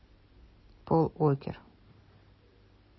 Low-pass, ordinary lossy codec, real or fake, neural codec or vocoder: 7.2 kHz; MP3, 24 kbps; real; none